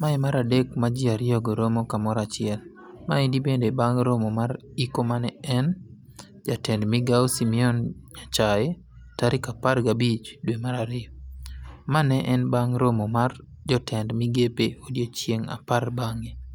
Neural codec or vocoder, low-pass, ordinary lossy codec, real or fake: none; 19.8 kHz; none; real